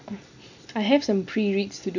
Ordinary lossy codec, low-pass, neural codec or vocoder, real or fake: none; 7.2 kHz; none; real